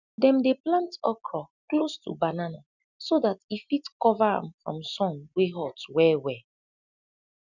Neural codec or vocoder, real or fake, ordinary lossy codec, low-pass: none; real; none; 7.2 kHz